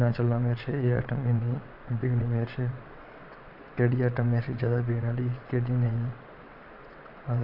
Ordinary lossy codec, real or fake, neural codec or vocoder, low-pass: none; fake; vocoder, 22.05 kHz, 80 mel bands, WaveNeXt; 5.4 kHz